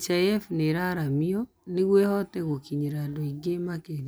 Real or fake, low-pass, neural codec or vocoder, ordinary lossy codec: real; none; none; none